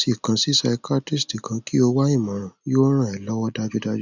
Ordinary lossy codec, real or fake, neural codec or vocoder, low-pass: none; real; none; 7.2 kHz